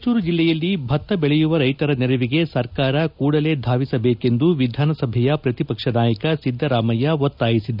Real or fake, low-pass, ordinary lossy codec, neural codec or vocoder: real; 5.4 kHz; none; none